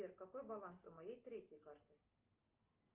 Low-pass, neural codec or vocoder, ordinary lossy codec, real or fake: 3.6 kHz; none; Opus, 24 kbps; real